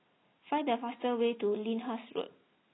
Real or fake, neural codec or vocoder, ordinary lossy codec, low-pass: real; none; AAC, 16 kbps; 7.2 kHz